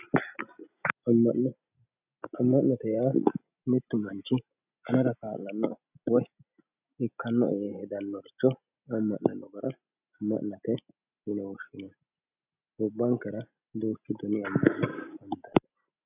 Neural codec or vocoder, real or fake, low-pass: none; real; 3.6 kHz